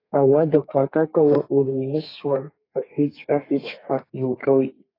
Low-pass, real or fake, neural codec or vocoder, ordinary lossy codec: 5.4 kHz; fake; codec, 32 kHz, 1.9 kbps, SNAC; AAC, 24 kbps